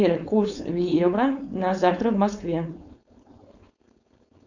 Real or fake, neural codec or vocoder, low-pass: fake; codec, 16 kHz, 4.8 kbps, FACodec; 7.2 kHz